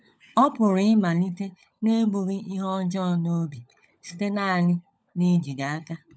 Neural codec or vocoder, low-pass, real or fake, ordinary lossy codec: codec, 16 kHz, 16 kbps, FunCodec, trained on LibriTTS, 50 frames a second; none; fake; none